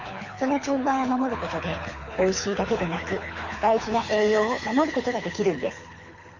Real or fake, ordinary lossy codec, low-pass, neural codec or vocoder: fake; Opus, 64 kbps; 7.2 kHz; codec, 24 kHz, 6 kbps, HILCodec